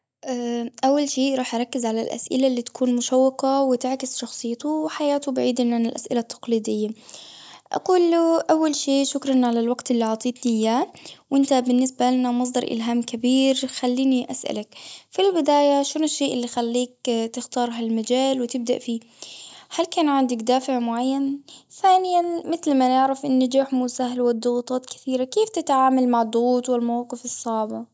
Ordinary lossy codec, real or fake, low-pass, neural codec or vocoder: none; real; none; none